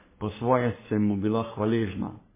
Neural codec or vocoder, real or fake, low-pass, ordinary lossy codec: codec, 44.1 kHz, 3.4 kbps, Pupu-Codec; fake; 3.6 kHz; MP3, 16 kbps